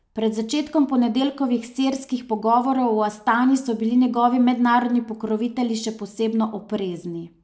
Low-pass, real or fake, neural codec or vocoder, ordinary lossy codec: none; real; none; none